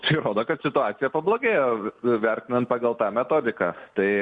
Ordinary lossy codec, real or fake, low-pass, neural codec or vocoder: Opus, 64 kbps; real; 9.9 kHz; none